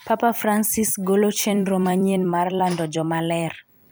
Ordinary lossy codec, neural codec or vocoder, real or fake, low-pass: none; vocoder, 44.1 kHz, 128 mel bands every 256 samples, BigVGAN v2; fake; none